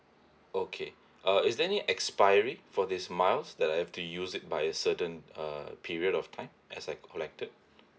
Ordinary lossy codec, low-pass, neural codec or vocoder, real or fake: none; none; none; real